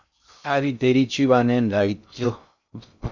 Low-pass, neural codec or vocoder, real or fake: 7.2 kHz; codec, 16 kHz in and 24 kHz out, 0.6 kbps, FocalCodec, streaming, 2048 codes; fake